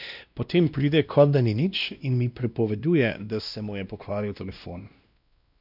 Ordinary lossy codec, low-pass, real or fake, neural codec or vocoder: none; 5.4 kHz; fake; codec, 16 kHz, 1 kbps, X-Codec, WavLM features, trained on Multilingual LibriSpeech